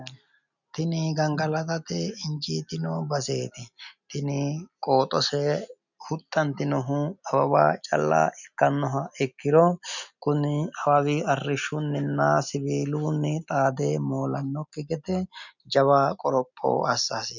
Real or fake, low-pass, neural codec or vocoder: real; 7.2 kHz; none